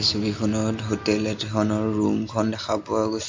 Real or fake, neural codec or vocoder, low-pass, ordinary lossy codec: real; none; 7.2 kHz; MP3, 48 kbps